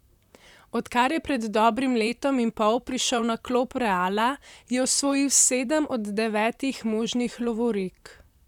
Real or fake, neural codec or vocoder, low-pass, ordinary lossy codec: fake; vocoder, 44.1 kHz, 128 mel bands, Pupu-Vocoder; 19.8 kHz; none